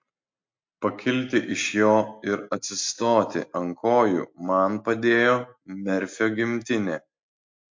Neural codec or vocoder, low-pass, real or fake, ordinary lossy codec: none; 7.2 kHz; real; MP3, 48 kbps